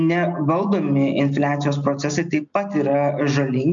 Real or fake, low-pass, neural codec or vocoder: real; 7.2 kHz; none